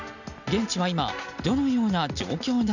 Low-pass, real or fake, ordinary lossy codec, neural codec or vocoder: 7.2 kHz; real; none; none